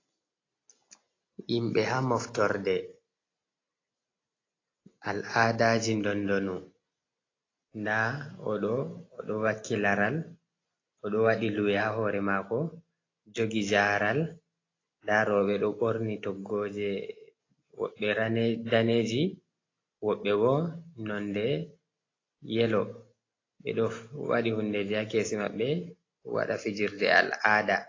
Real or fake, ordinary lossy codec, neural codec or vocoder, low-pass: real; AAC, 32 kbps; none; 7.2 kHz